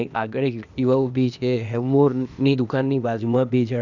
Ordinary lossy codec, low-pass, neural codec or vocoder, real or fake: none; 7.2 kHz; codec, 16 kHz, 0.8 kbps, ZipCodec; fake